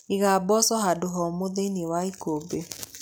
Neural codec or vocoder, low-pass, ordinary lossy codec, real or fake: none; none; none; real